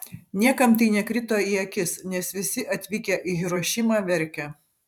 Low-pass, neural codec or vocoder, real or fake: 19.8 kHz; vocoder, 44.1 kHz, 128 mel bands every 512 samples, BigVGAN v2; fake